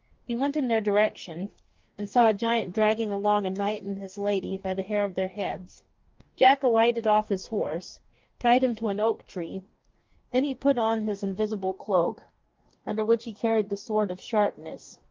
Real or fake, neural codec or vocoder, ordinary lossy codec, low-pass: fake; codec, 44.1 kHz, 2.6 kbps, DAC; Opus, 32 kbps; 7.2 kHz